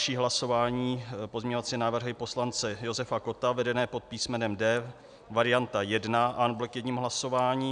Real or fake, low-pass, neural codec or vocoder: real; 9.9 kHz; none